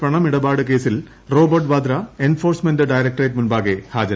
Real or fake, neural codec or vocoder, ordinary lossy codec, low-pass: real; none; none; none